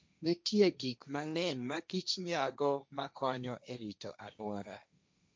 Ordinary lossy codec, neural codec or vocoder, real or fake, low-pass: none; codec, 16 kHz, 1.1 kbps, Voila-Tokenizer; fake; none